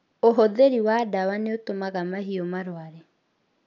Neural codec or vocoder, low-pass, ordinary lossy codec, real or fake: none; 7.2 kHz; none; real